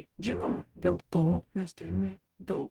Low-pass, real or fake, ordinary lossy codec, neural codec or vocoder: 19.8 kHz; fake; Opus, 24 kbps; codec, 44.1 kHz, 0.9 kbps, DAC